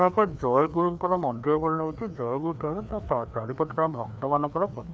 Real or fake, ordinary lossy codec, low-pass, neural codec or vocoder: fake; none; none; codec, 16 kHz, 2 kbps, FreqCodec, larger model